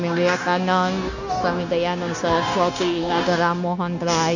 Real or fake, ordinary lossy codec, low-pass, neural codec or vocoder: fake; none; 7.2 kHz; codec, 16 kHz, 0.9 kbps, LongCat-Audio-Codec